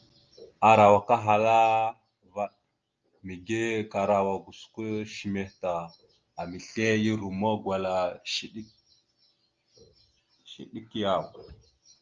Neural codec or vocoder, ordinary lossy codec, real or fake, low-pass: none; Opus, 24 kbps; real; 7.2 kHz